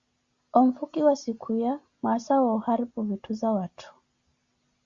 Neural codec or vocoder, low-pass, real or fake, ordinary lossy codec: none; 7.2 kHz; real; Opus, 64 kbps